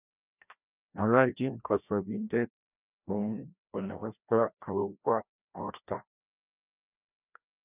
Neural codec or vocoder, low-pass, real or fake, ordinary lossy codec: codec, 16 kHz, 1 kbps, FreqCodec, larger model; 3.6 kHz; fake; none